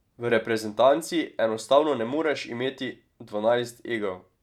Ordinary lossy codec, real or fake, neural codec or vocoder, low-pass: none; fake; vocoder, 44.1 kHz, 128 mel bands every 512 samples, BigVGAN v2; 19.8 kHz